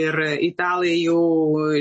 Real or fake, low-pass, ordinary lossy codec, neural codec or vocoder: real; 10.8 kHz; MP3, 32 kbps; none